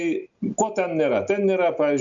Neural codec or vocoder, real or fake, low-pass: none; real; 7.2 kHz